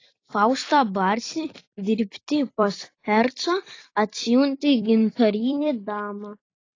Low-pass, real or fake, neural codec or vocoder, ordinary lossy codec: 7.2 kHz; fake; vocoder, 44.1 kHz, 128 mel bands every 256 samples, BigVGAN v2; AAC, 32 kbps